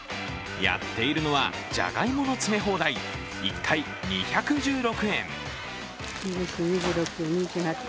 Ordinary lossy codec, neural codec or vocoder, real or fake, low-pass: none; none; real; none